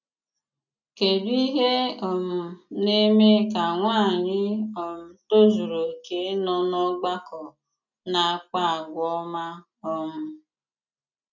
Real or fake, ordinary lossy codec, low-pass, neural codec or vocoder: real; none; 7.2 kHz; none